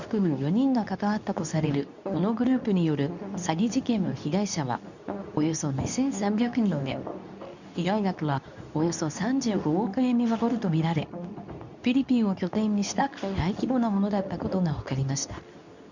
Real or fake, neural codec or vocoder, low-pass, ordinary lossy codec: fake; codec, 24 kHz, 0.9 kbps, WavTokenizer, medium speech release version 2; 7.2 kHz; none